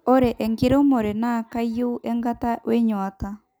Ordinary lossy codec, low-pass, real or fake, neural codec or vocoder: none; none; real; none